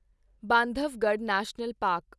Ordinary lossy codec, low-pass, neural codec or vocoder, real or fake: none; none; none; real